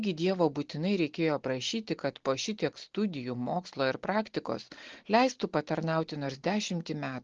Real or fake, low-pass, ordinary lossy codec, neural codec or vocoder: real; 7.2 kHz; Opus, 16 kbps; none